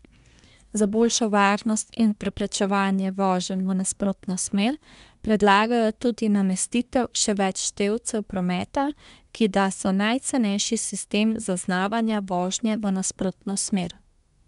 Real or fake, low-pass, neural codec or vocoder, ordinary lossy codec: fake; 10.8 kHz; codec, 24 kHz, 1 kbps, SNAC; none